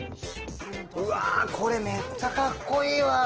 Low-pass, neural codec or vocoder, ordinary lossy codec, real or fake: 7.2 kHz; none; Opus, 16 kbps; real